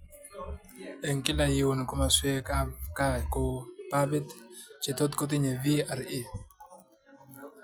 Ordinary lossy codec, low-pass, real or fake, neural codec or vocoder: none; none; real; none